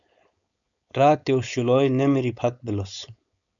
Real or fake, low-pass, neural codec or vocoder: fake; 7.2 kHz; codec, 16 kHz, 4.8 kbps, FACodec